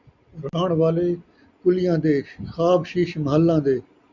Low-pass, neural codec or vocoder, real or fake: 7.2 kHz; none; real